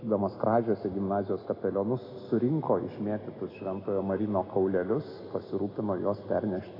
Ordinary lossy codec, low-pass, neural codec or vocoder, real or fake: AAC, 24 kbps; 5.4 kHz; none; real